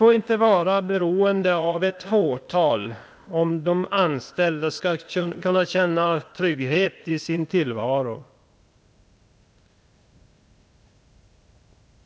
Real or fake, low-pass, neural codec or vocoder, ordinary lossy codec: fake; none; codec, 16 kHz, 0.8 kbps, ZipCodec; none